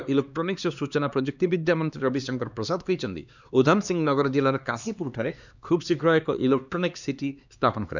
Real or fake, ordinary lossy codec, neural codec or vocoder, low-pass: fake; none; codec, 16 kHz, 2 kbps, X-Codec, HuBERT features, trained on LibriSpeech; 7.2 kHz